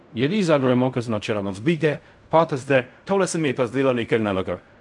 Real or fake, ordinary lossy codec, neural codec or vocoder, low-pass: fake; none; codec, 16 kHz in and 24 kHz out, 0.4 kbps, LongCat-Audio-Codec, fine tuned four codebook decoder; 10.8 kHz